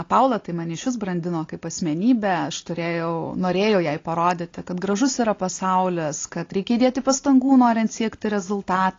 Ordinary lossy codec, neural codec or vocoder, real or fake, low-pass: AAC, 32 kbps; none; real; 7.2 kHz